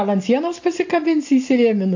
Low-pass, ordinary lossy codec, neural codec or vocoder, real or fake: 7.2 kHz; AAC, 48 kbps; none; real